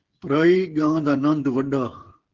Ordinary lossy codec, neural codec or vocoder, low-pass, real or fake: Opus, 16 kbps; codec, 16 kHz, 8 kbps, FreqCodec, smaller model; 7.2 kHz; fake